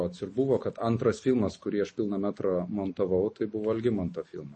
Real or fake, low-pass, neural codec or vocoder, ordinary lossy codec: real; 10.8 kHz; none; MP3, 32 kbps